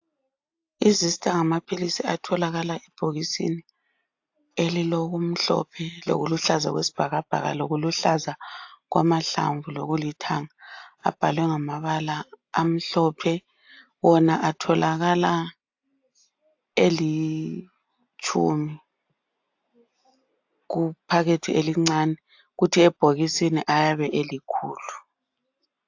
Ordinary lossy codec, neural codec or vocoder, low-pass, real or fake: AAC, 48 kbps; none; 7.2 kHz; real